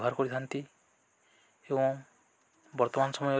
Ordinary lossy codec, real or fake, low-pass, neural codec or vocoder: none; real; none; none